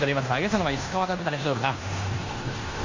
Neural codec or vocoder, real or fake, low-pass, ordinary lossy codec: codec, 16 kHz in and 24 kHz out, 0.9 kbps, LongCat-Audio-Codec, fine tuned four codebook decoder; fake; 7.2 kHz; AAC, 48 kbps